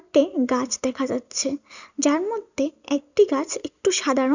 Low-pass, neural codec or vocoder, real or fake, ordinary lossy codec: 7.2 kHz; none; real; none